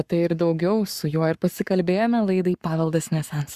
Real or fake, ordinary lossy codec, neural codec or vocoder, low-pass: fake; Opus, 64 kbps; codec, 44.1 kHz, 7.8 kbps, Pupu-Codec; 14.4 kHz